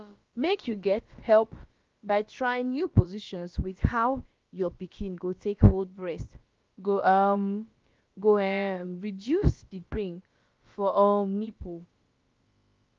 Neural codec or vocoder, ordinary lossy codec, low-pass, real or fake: codec, 16 kHz, about 1 kbps, DyCAST, with the encoder's durations; Opus, 32 kbps; 7.2 kHz; fake